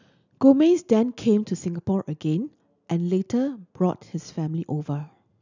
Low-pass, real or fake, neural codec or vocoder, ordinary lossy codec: 7.2 kHz; real; none; none